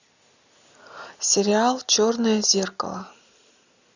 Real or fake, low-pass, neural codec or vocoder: real; 7.2 kHz; none